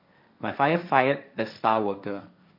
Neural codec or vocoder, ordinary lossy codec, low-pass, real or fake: codec, 16 kHz, 1.1 kbps, Voila-Tokenizer; MP3, 48 kbps; 5.4 kHz; fake